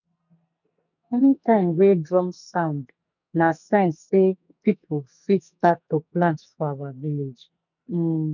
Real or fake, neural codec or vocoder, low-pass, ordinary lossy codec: fake; codec, 44.1 kHz, 2.6 kbps, SNAC; 7.2 kHz; none